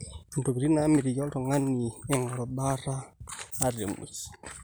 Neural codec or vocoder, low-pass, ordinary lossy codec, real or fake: none; none; none; real